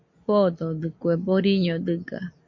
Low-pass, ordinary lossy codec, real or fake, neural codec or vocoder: 7.2 kHz; MP3, 48 kbps; real; none